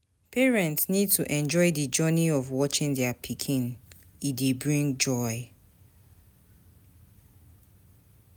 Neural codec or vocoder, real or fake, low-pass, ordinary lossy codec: none; real; none; none